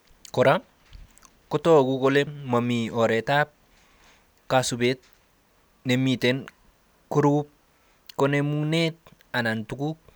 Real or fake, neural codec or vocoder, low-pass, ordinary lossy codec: real; none; none; none